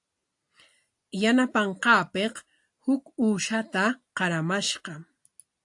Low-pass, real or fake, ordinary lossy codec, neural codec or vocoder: 10.8 kHz; real; AAC, 64 kbps; none